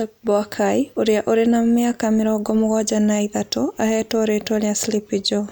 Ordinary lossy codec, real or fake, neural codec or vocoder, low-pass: none; real; none; none